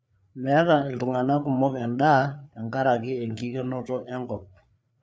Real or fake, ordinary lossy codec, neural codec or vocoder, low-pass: fake; none; codec, 16 kHz, 4 kbps, FreqCodec, larger model; none